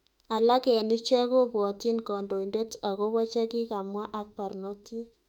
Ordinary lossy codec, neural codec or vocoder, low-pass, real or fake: none; autoencoder, 48 kHz, 32 numbers a frame, DAC-VAE, trained on Japanese speech; 19.8 kHz; fake